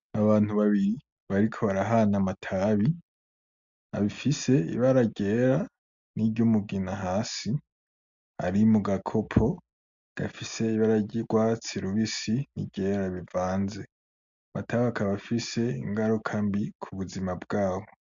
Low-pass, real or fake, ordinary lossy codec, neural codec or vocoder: 7.2 kHz; real; MP3, 64 kbps; none